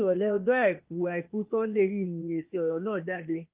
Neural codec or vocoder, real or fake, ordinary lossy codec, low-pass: codec, 16 kHz, 0.8 kbps, ZipCodec; fake; Opus, 24 kbps; 3.6 kHz